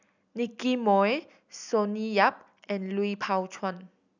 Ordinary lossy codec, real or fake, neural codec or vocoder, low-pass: none; real; none; 7.2 kHz